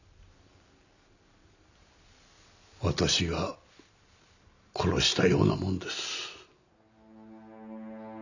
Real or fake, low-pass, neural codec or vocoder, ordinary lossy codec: real; 7.2 kHz; none; none